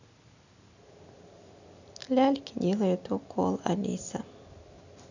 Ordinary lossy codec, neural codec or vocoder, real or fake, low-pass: none; none; real; 7.2 kHz